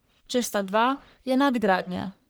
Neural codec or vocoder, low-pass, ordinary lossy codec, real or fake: codec, 44.1 kHz, 1.7 kbps, Pupu-Codec; none; none; fake